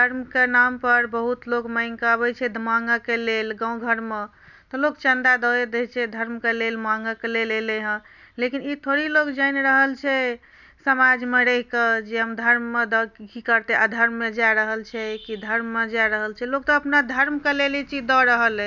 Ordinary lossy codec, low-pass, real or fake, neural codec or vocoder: none; 7.2 kHz; real; none